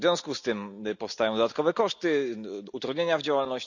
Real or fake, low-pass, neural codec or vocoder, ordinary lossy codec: real; 7.2 kHz; none; none